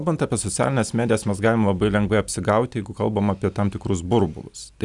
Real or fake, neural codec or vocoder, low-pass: real; none; 10.8 kHz